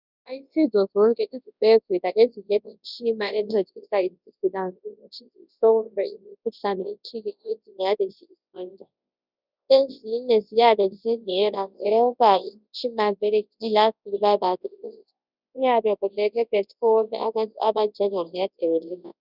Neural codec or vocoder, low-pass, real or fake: codec, 24 kHz, 0.9 kbps, WavTokenizer, large speech release; 5.4 kHz; fake